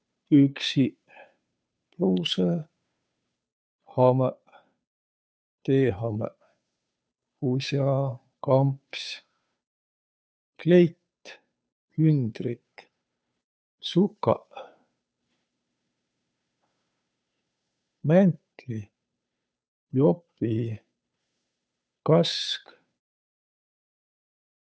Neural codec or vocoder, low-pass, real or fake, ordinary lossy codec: codec, 16 kHz, 2 kbps, FunCodec, trained on Chinese and English, 25 frames a second; none; fake; none